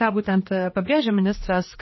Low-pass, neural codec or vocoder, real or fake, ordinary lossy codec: 7.2 kHz; codec, 16 kHz, 2 kbps, X-Codec, HuBERT features, trained on general audio; fake; MP3, 24 kbps